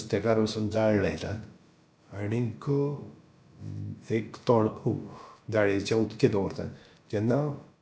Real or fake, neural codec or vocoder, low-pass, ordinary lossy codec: fake; codec, 16 kHz, about 1 kbps, DyCAST, with the encoder's durations; none; none